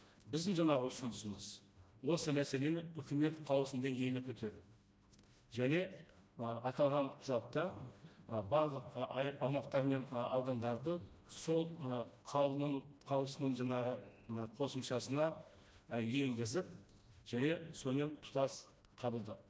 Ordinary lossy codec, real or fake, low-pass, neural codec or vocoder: none; fake; none; codec, 16 kHz, 1 kbps, FreqCodec, smaller model